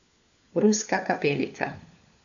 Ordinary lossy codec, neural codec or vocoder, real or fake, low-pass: none; codec, 16 kHz, 4 kbps, FunCodec, trained on LibriTTS, 50 frames a second; fake; 7.2 kHz